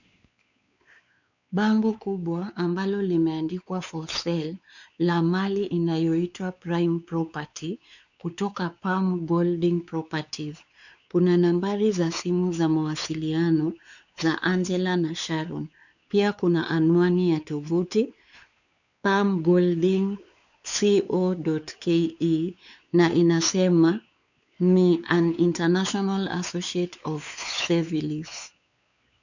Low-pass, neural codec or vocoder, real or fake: 7.2 kHz; codec, 16 kHz, 4 kbps, X-Codec, WavLM features, trained on Multilingual LibriSpeech; fake